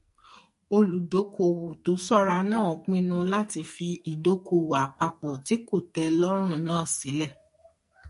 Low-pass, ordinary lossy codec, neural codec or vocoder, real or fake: 14.4 kHz; MP3, 48 kbps; codec, 44.1 kHz, 2.6 kbps, SNAC; fake